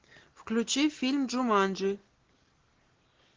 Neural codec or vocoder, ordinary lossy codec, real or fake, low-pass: none; Opus, 16 kbps; real; 7.2 kHz